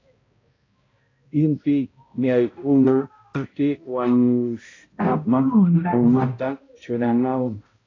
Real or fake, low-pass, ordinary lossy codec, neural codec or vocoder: fake; 7.2 kHz; AAC, 32 kbps; codec, 16 kHz, 0.5 kbps, X-Codec, HuBERT features, trained on balanced general audio